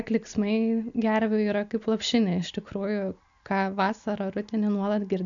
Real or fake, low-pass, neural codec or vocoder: real; 7.2 kHz; none